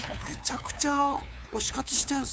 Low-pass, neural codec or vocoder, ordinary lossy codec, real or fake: none; codec, 16 kHz, 2 kbps, FunCodec, trained on LibriTTS, 25 frames a second; none; fake